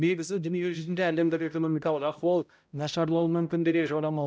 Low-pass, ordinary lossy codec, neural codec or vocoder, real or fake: none; none; codec, 16 kHz, 0.5 kbps, X-Codec, HuBERT features, trained on balanced general audio; fake